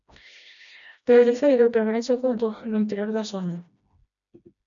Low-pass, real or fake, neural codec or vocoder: 7.2 kHz; fake; codec, 16 kHz, 1 kbps, FreqCodec, smaller model